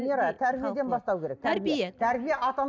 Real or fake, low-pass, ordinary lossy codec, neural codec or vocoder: real; none; none; none